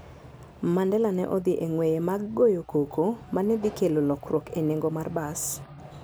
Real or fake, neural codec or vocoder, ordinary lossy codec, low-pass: real; none; none; none